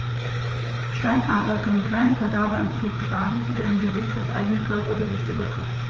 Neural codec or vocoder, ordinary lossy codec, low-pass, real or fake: codec, 16 kHz, 4 kbps, FreqCodec, larger model; Opus, 24 kbps; 7.2 kHz; fake